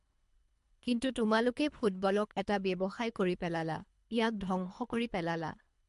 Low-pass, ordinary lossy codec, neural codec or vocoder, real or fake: 10.8 kHz; MP3, 64 kbps; codec, 24 kHz, 3 kbps, HILCodec; fake